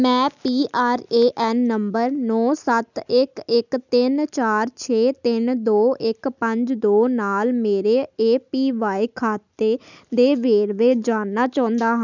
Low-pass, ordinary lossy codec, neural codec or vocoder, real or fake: 7.2 kHz; none; none; real